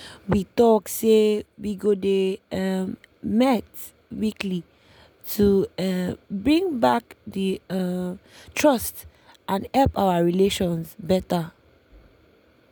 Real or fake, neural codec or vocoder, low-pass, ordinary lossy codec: real; none; none; none